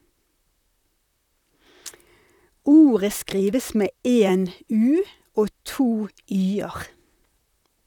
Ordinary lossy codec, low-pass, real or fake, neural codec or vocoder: none; 19.8 kHz; fake; vocoder, 44.1 kHz, 128 mel bands, Pupu-Vocoder